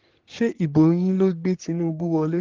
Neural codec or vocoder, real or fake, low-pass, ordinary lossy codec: codec, 44.1 kHz, 3.4 kbps, Pupu-Codec; fake; 7.2 kHz; Opus, 16 kbps